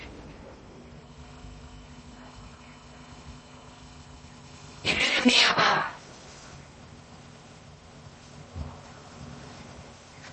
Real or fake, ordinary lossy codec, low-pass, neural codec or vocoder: fake; MP3, 32 kbps; 10.8 kHz; codec, 16 kHz in and 24 kHz out, 0.6 kbps, FocalCodec, streaming, 2048 codes